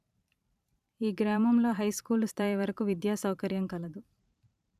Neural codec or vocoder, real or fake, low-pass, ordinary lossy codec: vocoder, 44.1 kHz, 128 mel bands every 512 samples, BigVGAN v2; fake; 14.4 kHz; none